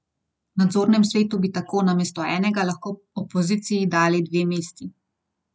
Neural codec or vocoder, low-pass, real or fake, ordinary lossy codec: none; none; real; none